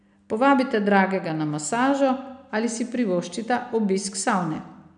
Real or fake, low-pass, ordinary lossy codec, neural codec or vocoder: real; 9.9 kHz; none; none